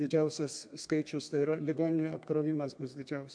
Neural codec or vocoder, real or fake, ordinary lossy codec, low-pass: codec, 32 kHz, 1.9 kbps, SNAC; fake; MP3, 64 kbps; 9.9 kHz